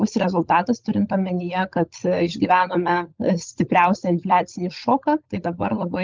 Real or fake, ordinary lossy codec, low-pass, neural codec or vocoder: fake; Opus, 24 kbps; 7.2 kHz; codec, 16 kHz, 16 kbps, FunCodec, trained on LibriTTS, 50 frames a second